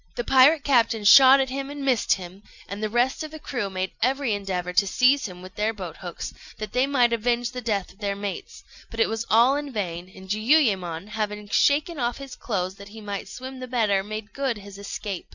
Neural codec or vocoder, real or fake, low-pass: none; real; 7.2 kHz